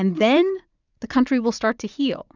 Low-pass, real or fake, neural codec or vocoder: 7.2 kHz; fake; vocoder, 44.1 kHz, 80 mel bands, Vocos